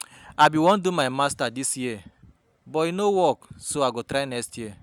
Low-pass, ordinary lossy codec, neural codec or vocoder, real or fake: none; none; none; real